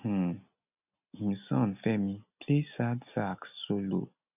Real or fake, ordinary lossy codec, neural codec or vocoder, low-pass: real; none; none; 3.6 kHz